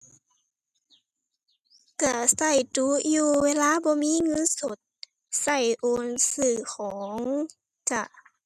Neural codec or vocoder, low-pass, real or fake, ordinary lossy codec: none; 14.4 kHz; real; none